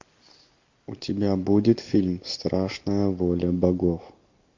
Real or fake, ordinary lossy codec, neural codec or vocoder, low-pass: real; MP3, 48 kbps; none; 7.2 kHz